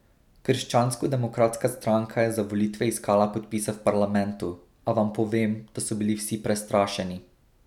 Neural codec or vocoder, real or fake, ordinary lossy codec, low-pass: none; real; none; 19.8 kHz